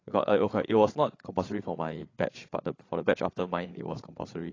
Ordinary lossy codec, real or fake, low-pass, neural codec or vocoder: AAC, 32 kbps; fake; 7.2 kHz; codec, 16 kHz, 8 kbps, FreqCodec, larger model